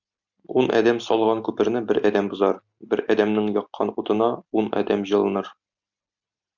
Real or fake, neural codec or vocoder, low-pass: real; none; 7.2 kHz